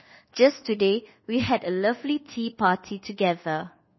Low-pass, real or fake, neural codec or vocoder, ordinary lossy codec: 7.2 kHz; fake; codec, 24 kHz, 1.2 kbps, DualCodec; MP3, 24 kbps